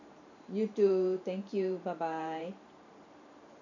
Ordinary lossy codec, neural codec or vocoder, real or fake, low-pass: none; vocoder, 44.1 kHz, 128 mel bands every 512 samples, BigVGAN v2; fake; 7.2 kHz